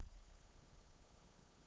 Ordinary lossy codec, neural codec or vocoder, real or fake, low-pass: none; codec, 16 kHz, 8 kbps, FunCodec, trained on LibriTTS, 25 frames a second; fake; none